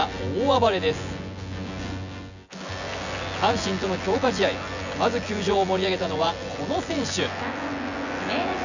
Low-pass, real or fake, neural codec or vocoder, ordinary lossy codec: 7.2 kHz; fake; vocoder, 24 kHz, 100 mel bands, Vocos; none